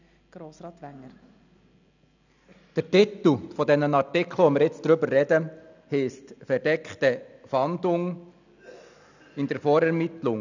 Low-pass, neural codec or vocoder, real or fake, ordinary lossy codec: 7.2 kHz; none; real; none